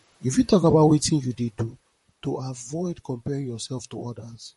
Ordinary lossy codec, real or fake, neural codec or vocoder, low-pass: MP3, 48 kbps; fake; vocoder, 44.1 kHz, 128 mel bands, Pupu-Vocoder; 19.8 kHz